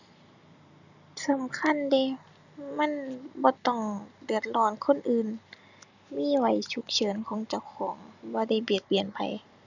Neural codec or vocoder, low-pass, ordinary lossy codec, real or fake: none; 7.2 kHz; none; real